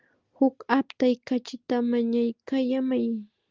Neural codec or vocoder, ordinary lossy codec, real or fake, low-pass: none; Opus, 32 kbps; real; 7.2 kHz